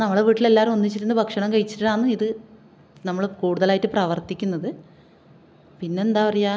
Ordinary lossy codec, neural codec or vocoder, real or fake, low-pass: none; none; real; none